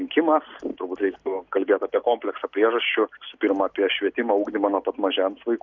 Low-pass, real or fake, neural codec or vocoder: 7.2 kHz; real; none